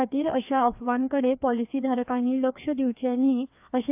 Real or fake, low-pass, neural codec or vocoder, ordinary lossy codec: fake; 3.6 kHz; codec, 16 kHz, 2 kbps, FreqCodec, larger model; AAC, 32 kbps